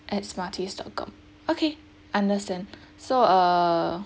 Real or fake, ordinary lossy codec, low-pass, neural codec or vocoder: real; none; none; none